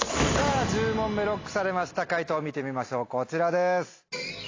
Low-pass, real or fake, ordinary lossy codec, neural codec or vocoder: 7.2 kHz; real; AAC, 32 kbps; none